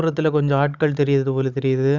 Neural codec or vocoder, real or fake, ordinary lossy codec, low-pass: codec, 16 kHz, 16 kbps, FunCodec, trained on Chinese and English, 50 frames a second; fake; none; 7.2 kHz